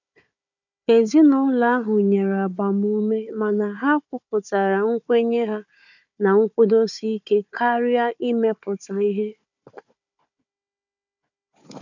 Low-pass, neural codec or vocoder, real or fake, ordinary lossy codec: 7.2 kHz; codec, 16 kHz, 4 kbps, FunCodec, trained on Chinese and English, 50 frames a second; fake; none